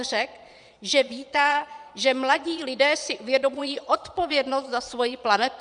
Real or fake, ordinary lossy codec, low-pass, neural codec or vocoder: fake; MP3, 96 kbps; 9.9 kHz; vocoder, 22.05 kHz, 80 mel bands, Vocos